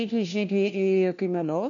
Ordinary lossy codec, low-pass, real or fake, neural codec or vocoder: AAC, 64 kbps; 7.2 kHz; fake; codec, 16 kHz, 1 kbps, FunCodec, trained on LibriTTS, 50 frames a second